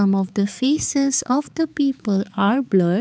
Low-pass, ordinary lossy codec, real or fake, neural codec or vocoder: none; none; fake; codec, 16 kHz, 4 kbps, X-Codec, HuBERT features, trained on balanced general audio